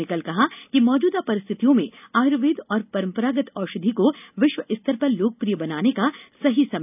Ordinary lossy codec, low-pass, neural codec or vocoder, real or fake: none; 3.6 kHz; none; real